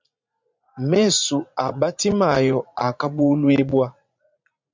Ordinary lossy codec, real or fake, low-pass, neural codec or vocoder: MP3, 64 kbps; fake; 7.2 kHz; vocoder, 44.1 kHz, 80 mel bands, Vocos